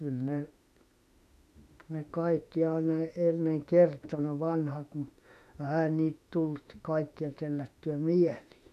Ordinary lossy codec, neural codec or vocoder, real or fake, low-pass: none; autoencoder, 48 kHz, 32 numbers a frame, DAC-VAE, trained on Japanese speech; fake; 14.4 kHz